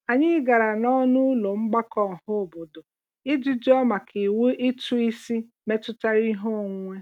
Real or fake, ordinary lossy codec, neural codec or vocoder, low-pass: real; none; none; 19.8 kHz